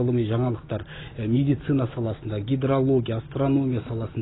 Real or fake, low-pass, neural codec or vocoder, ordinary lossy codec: real; 7.2 kHz; none; AAC, 16 kbps